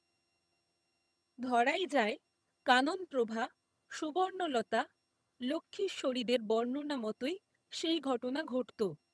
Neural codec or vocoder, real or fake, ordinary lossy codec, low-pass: vocoder, 22.05 kHz, 80 mel bands, HiFi-GAN; fake; none; none